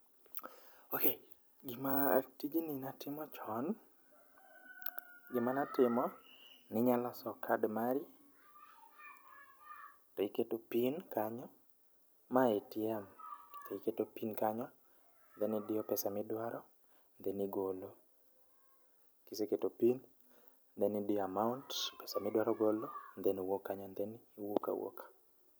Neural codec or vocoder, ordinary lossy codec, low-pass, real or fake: none; none; none; real